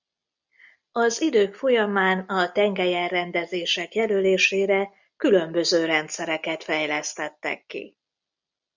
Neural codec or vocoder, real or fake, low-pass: none; real; 7.2 kHz